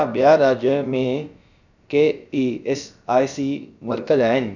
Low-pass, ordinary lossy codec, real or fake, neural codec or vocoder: 7.2 kHz; none; fake; codec, 16 kHz, about 1 kbps, DyCAST, with the encoder's durations